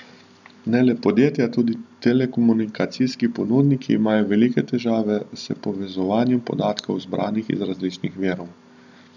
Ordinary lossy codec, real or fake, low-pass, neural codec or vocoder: none; real; 7.2 kHz; none